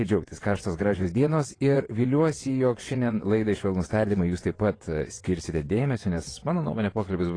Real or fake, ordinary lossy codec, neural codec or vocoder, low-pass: fake; AAC, 32 kbps; vocoder, 22.05 kHz, 80 mel bands, WaveNeXt; 9.9 kHz